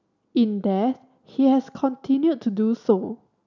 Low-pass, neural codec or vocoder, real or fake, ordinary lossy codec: 7.2 kHz; none; real; none